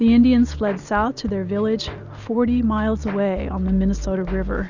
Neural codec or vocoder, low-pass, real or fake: none; 7.2 kHz; real